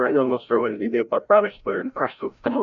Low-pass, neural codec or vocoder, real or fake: 7.2 kHz; codec, 16 kHz, 0.5 kbps, FreqCodec, larger model; fake